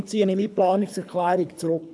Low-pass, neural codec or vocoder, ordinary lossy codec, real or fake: none; codec, 24 kHz, 3 kbps, HILCodec; none; fake